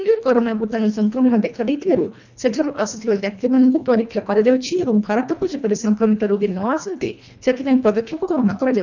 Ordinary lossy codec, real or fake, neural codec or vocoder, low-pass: none; fake; codec, 24 kHz, 1.5 kbps, HILCodec; 7.2 kHz